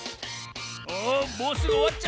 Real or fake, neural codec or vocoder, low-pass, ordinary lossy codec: real; none; none; none